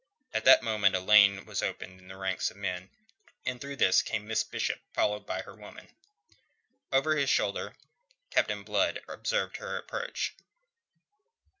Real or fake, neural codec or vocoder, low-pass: real; none; 7.2 kHz